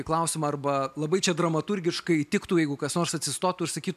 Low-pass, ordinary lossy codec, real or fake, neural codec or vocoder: 14.4 kHz; MP3, 96 kbps; real; none